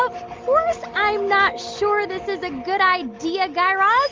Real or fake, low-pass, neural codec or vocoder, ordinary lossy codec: real; 7.2 kHz; none; Opus, 32 kbps